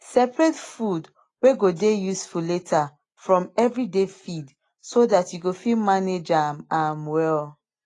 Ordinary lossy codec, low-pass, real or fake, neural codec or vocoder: AAC, 32 kbps; 10.8 kHz; real; none